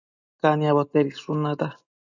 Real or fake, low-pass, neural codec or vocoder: real; 7.2 kHz; none